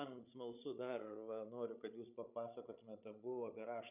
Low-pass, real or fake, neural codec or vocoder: 3.6 kHz; fake; codec, 16 kHz, 16 kbps, FreqCodec, larger model